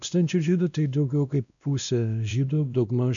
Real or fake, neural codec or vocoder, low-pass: fake; codec, 16 kHz, 1 kbps, X-Codec, WavLM features, trained on Multilingual LibriSpeech; 7.2 kHz